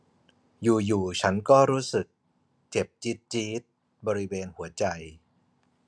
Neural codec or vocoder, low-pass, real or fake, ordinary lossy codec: none; none; real; none